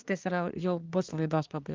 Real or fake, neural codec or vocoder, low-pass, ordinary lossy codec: fake; codec, 24 kHz, 1 kbps, SNAC; 7.2 kHz; Opus, 24 kbps